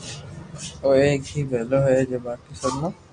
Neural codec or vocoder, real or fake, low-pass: none; real; 9.9 kHz